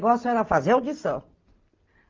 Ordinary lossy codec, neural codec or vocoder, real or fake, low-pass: Opus, 32 kbps; none; real; 7.2 kHz